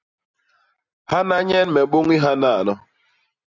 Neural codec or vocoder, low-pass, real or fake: none; 7.2 kHz; real